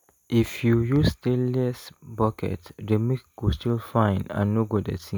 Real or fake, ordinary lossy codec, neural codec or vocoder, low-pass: real; none; none; none